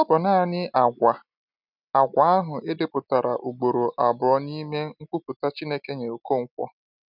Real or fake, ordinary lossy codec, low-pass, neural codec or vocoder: real; none; 5.4 kHz; none